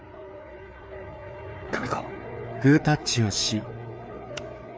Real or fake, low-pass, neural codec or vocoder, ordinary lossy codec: fake; none; codec, 16 kHz, 4 kbps, FreqCodec, larger model; none